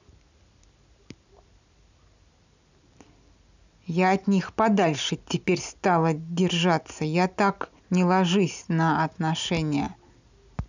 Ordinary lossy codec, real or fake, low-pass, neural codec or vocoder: none; real; 7.2 kHz; none